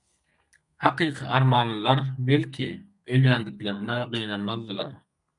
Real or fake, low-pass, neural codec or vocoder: fake; 10.8 kHz; codec, 32 kHz, 1.9 kbps, SNAC